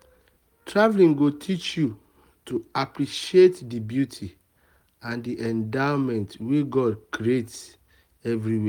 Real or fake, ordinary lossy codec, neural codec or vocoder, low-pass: real; Opus, 32 kbps; none; 19.8 kHz